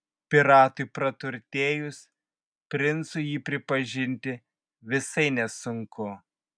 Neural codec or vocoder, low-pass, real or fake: none; 9.9 kHz; real